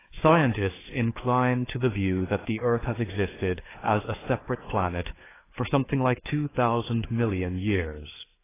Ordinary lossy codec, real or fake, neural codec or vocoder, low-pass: AAC, 16 kbps; fake; codec, 16 kHz, 4 kbps, FreqCodec, larger model; 3.6 kHz